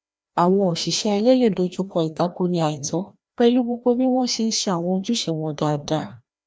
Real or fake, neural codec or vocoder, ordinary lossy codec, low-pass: fake; codec, 16 kHz, 1 kbps, FreqCodec, larger model; none; none